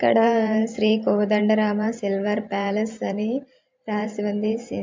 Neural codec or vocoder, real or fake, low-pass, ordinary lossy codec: vocoder, 44.1 kHz, 128 mel bands every 512 samples, BigVGAN v2; fake; 7.2 kHz; MP3, 64 kbps